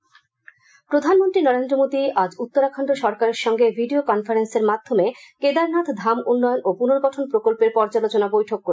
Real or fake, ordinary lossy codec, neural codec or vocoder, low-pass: real; none; none; none